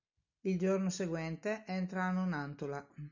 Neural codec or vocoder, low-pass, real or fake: none; 7.2 kHz; real